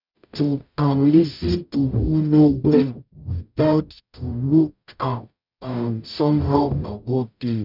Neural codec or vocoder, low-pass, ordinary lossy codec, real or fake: codec, 44.1 kHz, 0.9 kbps, DAC; 5.4 kHz; none; fake